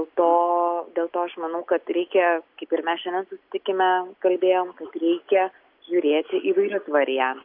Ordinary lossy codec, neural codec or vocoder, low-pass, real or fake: MP3, 48 kbps; none; 5.4 kHz; real